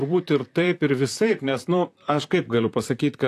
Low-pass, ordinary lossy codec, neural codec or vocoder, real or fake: 14.4 kHz; AAC, 64 kbps; vocoder, 44.1 kHz, 128 mel bands, Pupu-Vocoder; fake